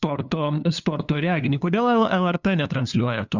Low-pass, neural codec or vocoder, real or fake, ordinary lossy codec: 7.2 kHz; codec, 16 kHz, 2 kbps, FunCodec, trained on LibriTTS, 25 frames a second; fake; Opus, 64 kbps